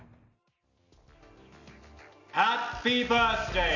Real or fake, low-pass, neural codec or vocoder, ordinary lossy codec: real; 7.2 kHz; none; none